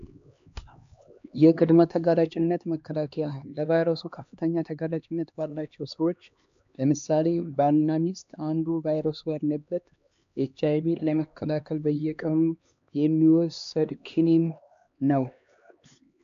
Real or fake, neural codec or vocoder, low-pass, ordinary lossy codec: fake; codec, 16 kHz, 2 kbps, X-Codec, HuBERT features, trained on LibriSpeech; 7.2 kHz; AAC, 64 kbps